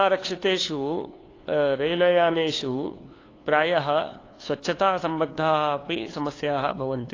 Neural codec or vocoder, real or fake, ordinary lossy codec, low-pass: codec, 16 kHz, 2 kbps, FunCodec, trained on LibriTTS, 25 frames a second; fake; AAC, 32 kbps; 7.2 kHz